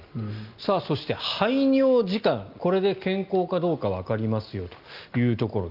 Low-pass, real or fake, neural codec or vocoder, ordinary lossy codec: 5.4 kHz; real; none; Opus, 24 kbps